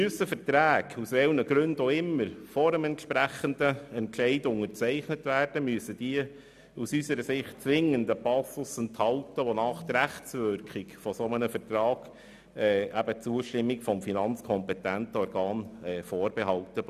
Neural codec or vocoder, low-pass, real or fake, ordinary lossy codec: none; 14.4 kHz; real; none